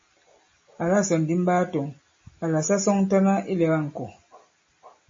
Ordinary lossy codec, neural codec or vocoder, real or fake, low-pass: MP3, 32 kbps; none; real; 7.2 kHz